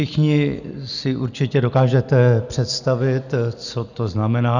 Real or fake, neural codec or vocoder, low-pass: real; none; 7.2 kHz